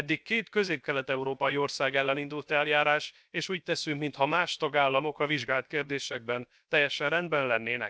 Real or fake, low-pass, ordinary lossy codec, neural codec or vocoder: fake; none; none; codec, 16 kHz, about 1 kbps, DyCAST, with the encoder's durations